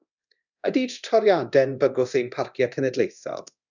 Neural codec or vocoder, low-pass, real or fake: codec, 24 kHz, 0.9 kbps, DualCodec; 7.2 kHz; fake